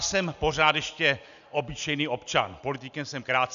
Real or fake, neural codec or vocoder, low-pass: real; none; 7.2 kHz